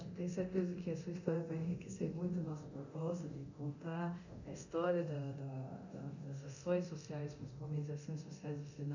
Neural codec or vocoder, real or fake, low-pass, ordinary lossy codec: codec, 24 kHz, 0.9 kbps, DualCodec; fake; 7.2 kHz; none